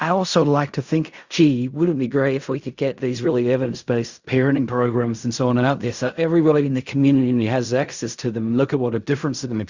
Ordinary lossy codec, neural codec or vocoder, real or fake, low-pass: Opus, 64 kbps; codec, 16 kHz in and 24 kHz out, 0.4 kbps, LongCat-Audio-Codec, fine tuned four codebook decoder; fake; 7.2 kHz